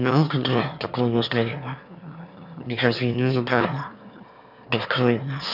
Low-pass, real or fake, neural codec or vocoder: 5.4 kHz; fake; autoencoder, 22.05 kHz, a latent of 192 numbers a frame, VITS, trained on one speaker